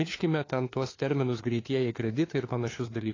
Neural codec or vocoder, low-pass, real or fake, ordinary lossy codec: codec, 16 kHz, 4 kbps, FunCodec, trained on LibriTTS, 50 frames a second; 7.2 kHz; fake; AAC, 32 kbps